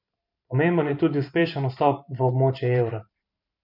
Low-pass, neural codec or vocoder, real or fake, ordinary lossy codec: 5.4 kHz; vocoder, 44.1 kHz, 128 mel bands every 512 samples, BigVGAN v2; fake; MP3, 48 kbps